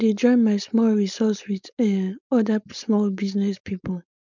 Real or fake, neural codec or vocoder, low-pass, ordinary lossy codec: fake; codec, 16 kHz, 4.8 kbps, FACodec; 7.2 kHz; none